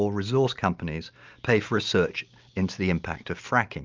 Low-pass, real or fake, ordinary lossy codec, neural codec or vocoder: 7.2 kHz; real; Opus, 24 kbps; none